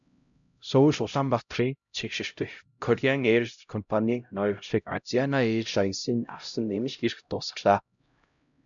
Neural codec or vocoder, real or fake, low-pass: codec, 16 kHz, 0.5 kbps, X-Codec, HuBERT features, trained on LibriSpeech; fake; 7.2 kHz